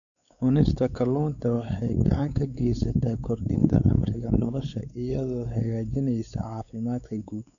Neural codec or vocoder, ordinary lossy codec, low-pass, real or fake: codec, 16 kHz, 4 kbps, X-Codec, WavLM features, trained on Multilingual LibriSpeech; none; 7.2 kHz; fake